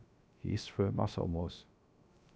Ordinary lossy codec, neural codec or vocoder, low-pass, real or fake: none; codec, 16 kHz, 0.3 kbps, FocalCodec; none; fake